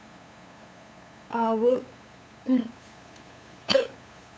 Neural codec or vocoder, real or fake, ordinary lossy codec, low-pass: codec, 16 kHz, 8 kbps, FunCodec, trained on LibriTTS, 25 frames a second; fake; none; none